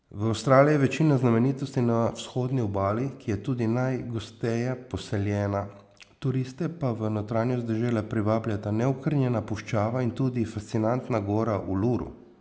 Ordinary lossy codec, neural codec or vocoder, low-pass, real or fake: none; none; none; real